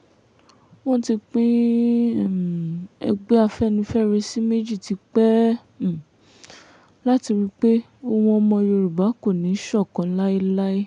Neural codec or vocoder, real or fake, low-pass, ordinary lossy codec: none; real; 9.9 kHz; none